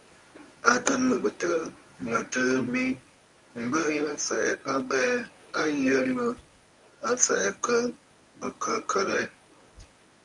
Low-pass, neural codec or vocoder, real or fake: 10.8 kHz; codec, 24 kHz, 0.9 kbps, WavTokenizer, medium speech release version 1; fake